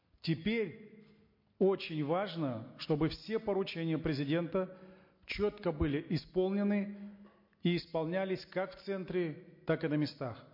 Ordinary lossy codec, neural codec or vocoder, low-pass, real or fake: MP3, 32 kbps; none; 5.4 kHz; real